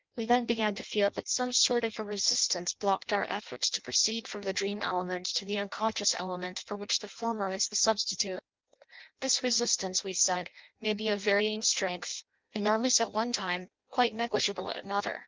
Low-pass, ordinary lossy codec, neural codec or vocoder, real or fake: 7.2 kHz; Opus, 24 kbps; codec, 16 kHz in and 24 kHz out, 0.6 kbps, FireRedTTS-2 codec; fake